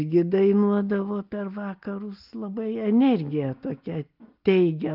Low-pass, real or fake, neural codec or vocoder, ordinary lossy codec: 5.4 kHz; real; none; Opus, 32 kbps